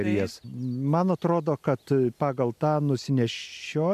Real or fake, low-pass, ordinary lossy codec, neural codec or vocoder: real; 14.4 kHz; MP3, 96 kbps; none